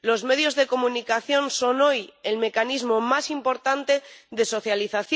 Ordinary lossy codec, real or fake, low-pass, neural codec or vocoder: none; real; none; none